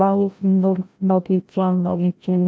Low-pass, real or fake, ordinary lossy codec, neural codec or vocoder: none; fake; none; codec, 16 kHz, 0.5 kbps, FreqCodec, larger model